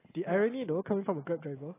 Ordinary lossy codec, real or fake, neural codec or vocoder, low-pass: AAC, 16 kbps; real; none; 3.6 kHz